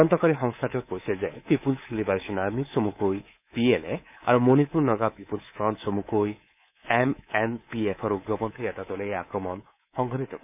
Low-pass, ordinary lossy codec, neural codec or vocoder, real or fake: 3.6 kHz; none; codec, 24 kHz, 3.1 kbps, DualCodec; fake